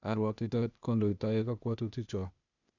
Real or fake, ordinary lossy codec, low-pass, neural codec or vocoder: fake; none; 7.2 kHz; codec, 16 kHz, 0.8 kbps, ZipCodec